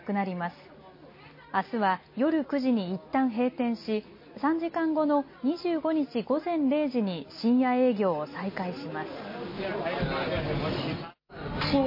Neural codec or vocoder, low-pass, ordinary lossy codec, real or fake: none; 5.4 kHz; MP3, 24 kbps; real